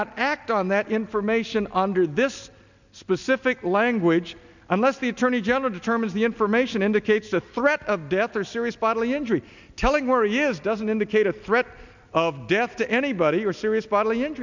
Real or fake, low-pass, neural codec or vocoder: real; 7.2 kHz; none